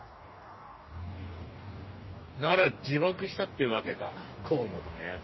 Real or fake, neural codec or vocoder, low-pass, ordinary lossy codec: fake; codec, 44.1 kHz, 2.6 kbps, DAC; 7.2 kHz; MP3, 24 kbps